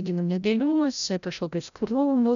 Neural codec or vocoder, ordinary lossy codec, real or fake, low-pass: codec, 16 kHz, 0.5 kbps, FreqCodec, larger model; MP3, 96 kbps; fake; 7.2 kHz